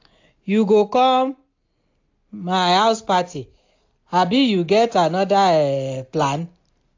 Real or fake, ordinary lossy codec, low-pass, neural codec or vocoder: real; AAC, 48 kbps; 7.2 kHz; none